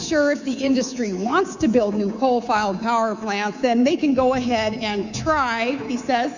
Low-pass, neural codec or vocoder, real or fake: 7.2 kHz; codec, 24 kHz, 3.1 kbps, DualCodec; fake